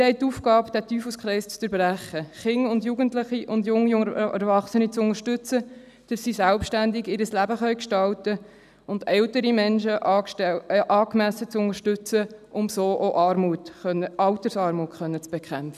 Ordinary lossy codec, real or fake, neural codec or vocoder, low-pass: none; real; none; 14.4 kHz